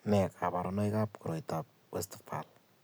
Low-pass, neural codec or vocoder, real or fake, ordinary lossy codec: none; none; real; none